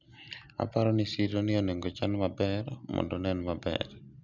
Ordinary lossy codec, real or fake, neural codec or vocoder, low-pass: none; real; none; 7.2 kHz